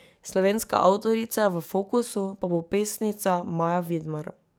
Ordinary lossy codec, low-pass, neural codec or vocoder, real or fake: none; none; codec, 44.1 kHz, 7.8 kbps, DAC; fake